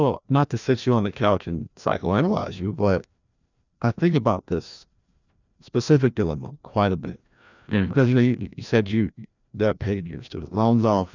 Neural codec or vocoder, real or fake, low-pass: codec, 16 kHz, 1 kbps, FreqCodec, larger model; fake; 7.2 kHz